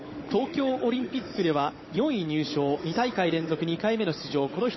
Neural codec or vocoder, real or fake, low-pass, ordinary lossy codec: codec, 16 kHz, 16 kbps, FunCodec, trained on Chinese and English, 50 frames a second; fake; 7.2 kHz; MP3, 24 kbps